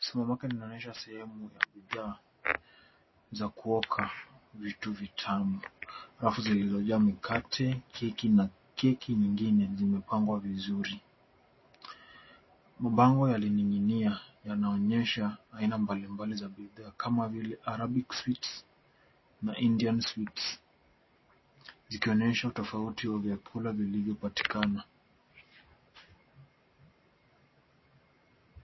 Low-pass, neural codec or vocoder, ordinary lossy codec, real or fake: 7.2 kHz; none; MP3, 24 kbps; real